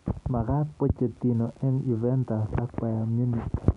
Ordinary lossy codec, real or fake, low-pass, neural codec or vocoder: none; real; 10.8 kHz; none